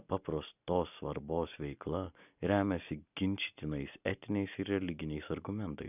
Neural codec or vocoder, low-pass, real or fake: none; 3.6 kHz; real